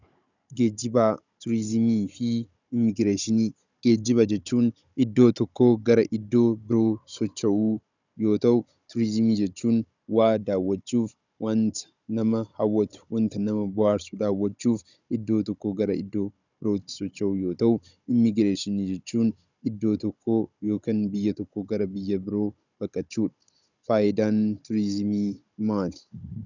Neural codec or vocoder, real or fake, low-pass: codec, 16 kHz, 16 kbps, FunCodec, trained on Chinese and English, 50 frames a second; fake; 7.2 kHz